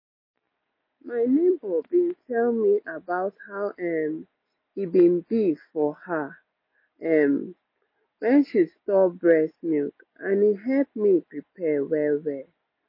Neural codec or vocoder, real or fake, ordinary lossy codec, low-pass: none; real; MP3, 24 kbps; 5.4 kHz